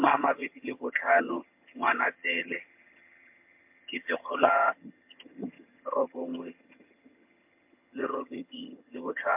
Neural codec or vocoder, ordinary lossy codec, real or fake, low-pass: vocoder, 22.05 kHz, 80 mel bands, HiFi-GAN; MP3, 32 kbps; fake; 3.6 kHz